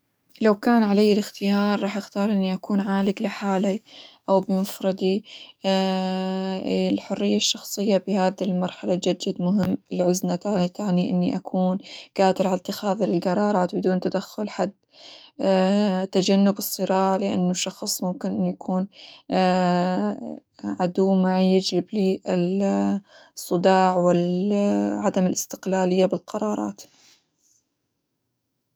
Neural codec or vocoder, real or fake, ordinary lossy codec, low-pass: codec, 44.1 kHz, 7.8 kbps, DAC; fake; none; none